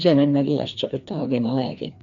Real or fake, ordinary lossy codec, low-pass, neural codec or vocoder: fake; MP3, 96 kbps; 7.2 kHz; codec, 16 kHz, 2 kbps, FreqCodec, larger model